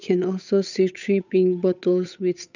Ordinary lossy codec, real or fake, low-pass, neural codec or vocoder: none; fake; 7.2 kHz; codec, 16 kHz, 8 kbps, FunCodec, trained on Chinese and English, 25 frames a second